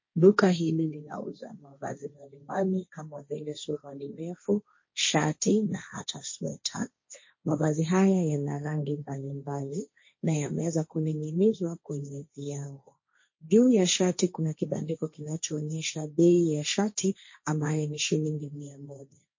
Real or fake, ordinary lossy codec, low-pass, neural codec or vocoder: fake; MP3, 32 kbps; 7.2 kHz; codec, 16 kHz, 1.1 kbps, Voila-Tokenizer